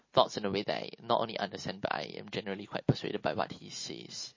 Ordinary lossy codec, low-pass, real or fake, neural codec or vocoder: MP3, 32 kbps; 7.2 kHz; real; none